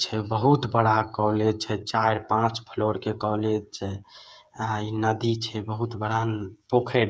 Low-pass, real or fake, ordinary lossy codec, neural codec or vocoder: none; fake; none; codec, 16 kHz, 8 kbps, FreqCodec, smaller model